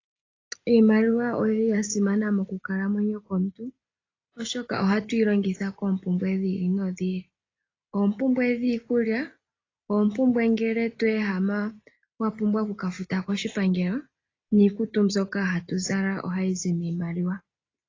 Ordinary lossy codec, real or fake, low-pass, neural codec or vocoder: AAC, 32 kbps; real; 7.2 kHz; none